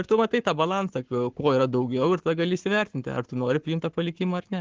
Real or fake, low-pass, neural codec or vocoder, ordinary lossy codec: fake; 7.2 kHz; codec, 44.1 kHz, 7.8 kbps, Pupu-Codec; Opus, 16 kbps